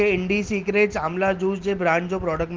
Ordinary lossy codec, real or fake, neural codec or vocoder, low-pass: Opus, 16 kbps; real; none; 7.2 kHz